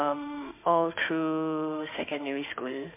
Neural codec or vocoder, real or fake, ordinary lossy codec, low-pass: autoencoder, 48 kHz, 32 numbers a frame, DAC-VAE, trained on Japanese speech; fake; MP3, 32 kbps; 3.6 kHz